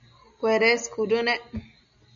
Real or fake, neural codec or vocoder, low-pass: real; none; 7.2 kHz